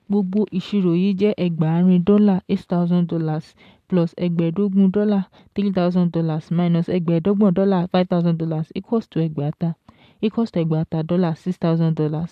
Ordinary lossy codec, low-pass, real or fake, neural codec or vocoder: AAC, 96 kbps; 14.4 kHz; real; none